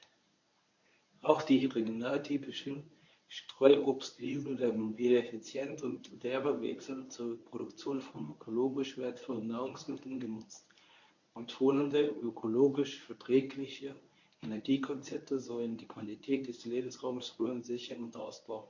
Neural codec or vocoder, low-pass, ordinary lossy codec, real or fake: codec, 24 kHz, 0.9 kbps, WavTokenizer, medium speech release version 1; 7.2 kHz; MP3, 48 kbps; fake